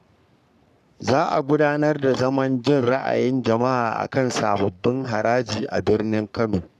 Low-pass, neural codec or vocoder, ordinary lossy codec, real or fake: 14.4 kHz; codec, 44.1 kHz, 3.4 kbps, Pupu-Codec; none; fake